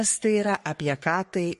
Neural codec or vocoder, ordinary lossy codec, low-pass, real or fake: codec, 44.1 kHz, 3.4 kbps, Pupu-Codec; MP3, 48 kbps; 14.4 kHz; fake